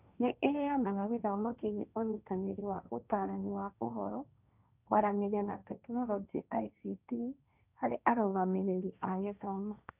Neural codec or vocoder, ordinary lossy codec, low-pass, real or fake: codec, 16 kHz, 1.1 kbps, Voila-Tokenizer; none; 3.6 kHz; fake